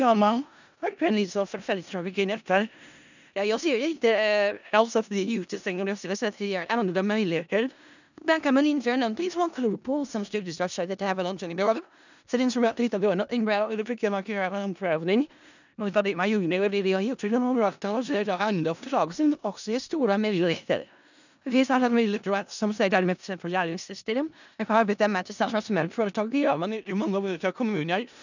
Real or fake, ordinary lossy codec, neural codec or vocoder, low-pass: fake; none; codec, 16 kHz in and 24 kHz out, 0.4 kbps, LongCat-Audio-Codec, four codebook decoder; 7.2 kHz